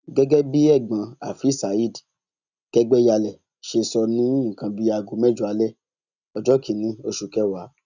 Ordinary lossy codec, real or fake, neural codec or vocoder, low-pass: none; real; none; 7.2 kHz